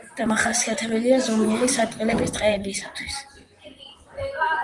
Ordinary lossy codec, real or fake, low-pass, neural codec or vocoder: Opus, 32 kbps; fake; 10.8 kHz; vocoder, 44.1 kHz, 128 mel bands, Pupu-Vocoder